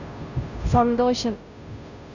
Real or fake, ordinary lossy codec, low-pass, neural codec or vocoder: fake; none; 7.2 kHz; codec, 16 kHz, 0.5 kbps, FunCodec, trained on Chinese and English, 25 frames a second